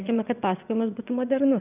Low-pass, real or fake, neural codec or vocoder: 3.6 kHz; fake; vocoder, 22.05 kHz, 80 mel bands, WaveNeXt